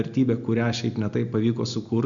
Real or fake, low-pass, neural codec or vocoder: real; 7.2 kHz; none